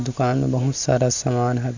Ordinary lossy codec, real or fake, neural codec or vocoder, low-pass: none; real; none; 7.2 kHz